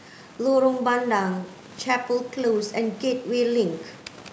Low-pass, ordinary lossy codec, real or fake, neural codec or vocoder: none; none; real; none